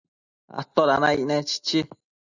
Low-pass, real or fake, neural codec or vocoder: 7.2 kHz; real; none